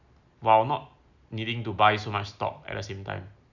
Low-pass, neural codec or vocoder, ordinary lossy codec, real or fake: 7.2 kHz; none; none; real